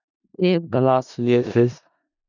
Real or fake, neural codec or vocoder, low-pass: fake; codec, 16 kHz in and 24 kHz out, 0.4 kbps, LongCat-Audio-Codec, four codebook decoder; 7.2 kHz